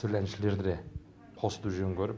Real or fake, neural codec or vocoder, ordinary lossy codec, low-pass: real; none; none; none